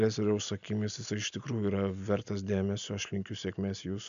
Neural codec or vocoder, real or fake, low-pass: none; real; 7.2 kHz